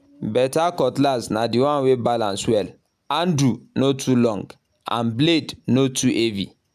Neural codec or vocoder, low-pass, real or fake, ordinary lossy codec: none; 14.4 kHz; real; none